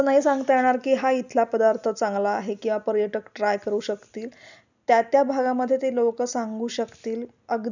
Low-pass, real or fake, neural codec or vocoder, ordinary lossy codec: 7.2 kHz; real; none; none